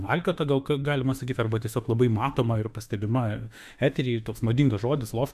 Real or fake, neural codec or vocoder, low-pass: fake; autoencoder, 48 kHz, 32 numbers a frame, DAC-VAE, trained on Japanese speech; 14.4 kHz